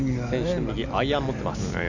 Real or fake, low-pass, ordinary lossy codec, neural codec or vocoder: real; 7.2 kHz; none; none